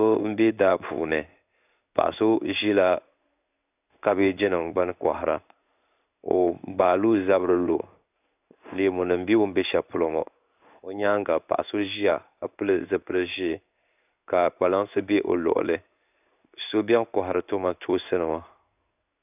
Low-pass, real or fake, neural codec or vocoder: 3.6 kHz; fake; codec, 16 kHz in and 24 kHz out, 1 kbps, XY-Tokenizer